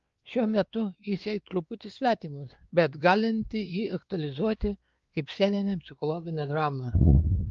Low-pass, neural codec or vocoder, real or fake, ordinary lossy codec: 7.2 kHz; codec, 16 kHz, 2 kbps, X-Codec, WavLM features, trained on Multilingual LibriSpeech; fake; Opus, 32 kbps